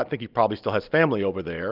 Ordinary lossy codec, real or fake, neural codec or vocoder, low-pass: Opus, 32 kbps; real; none; 5.4 kHz